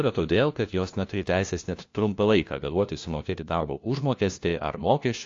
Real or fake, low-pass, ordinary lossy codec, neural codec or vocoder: fake; 7.2 kHz; AAC, 48 kbps; codec, 16 kHz, 1 kbps, FunCodec, trained on LibriTTS, 50 frames a second